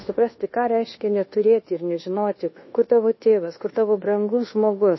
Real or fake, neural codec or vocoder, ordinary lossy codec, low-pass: fake; codec, 24 kHz, 1.2 kbps, DualCodec; MP3, 24 kbps; 7.2 kHz